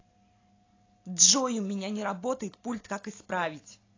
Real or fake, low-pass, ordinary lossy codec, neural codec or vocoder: real; 7.2 kHz; AAC, 32 kbps; none